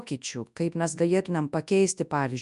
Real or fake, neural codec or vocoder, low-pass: fake; codec, 24 kHz, 0.9 kbps, WavTokenizer, large speech release; 10.8 kHz